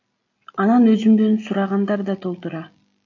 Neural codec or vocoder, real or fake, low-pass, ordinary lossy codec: none; real; 7.2 kHz; AAC, 48 kbps